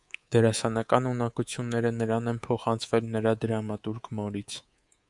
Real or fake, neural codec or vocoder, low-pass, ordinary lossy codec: fake; codec, 24 kHz, 3.1 kbps, DualCodec; 10.8 kHz; Opus, 64 kbps